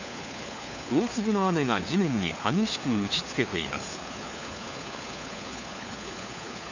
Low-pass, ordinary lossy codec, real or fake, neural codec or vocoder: 7.2 kHz; none; fake; codec, 16 kHz, 4 kbps, FunCodec, trained on LibriTTS, 50 frames a second